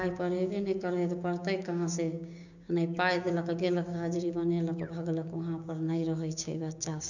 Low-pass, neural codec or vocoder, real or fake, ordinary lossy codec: 7.2 kHz; codec, 16 kHz, 6 kbps, DAC; fake; none